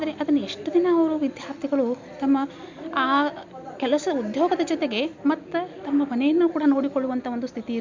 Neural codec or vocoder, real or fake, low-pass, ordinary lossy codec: none; real; 7.2 kHz; MP3, 64 kbps